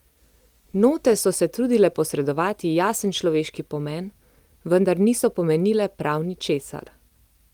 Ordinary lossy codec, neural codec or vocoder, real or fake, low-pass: Opus, 32 kbps; none; real; 19.8 kHz